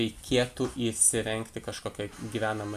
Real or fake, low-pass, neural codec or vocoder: real; 14.4 kHz; none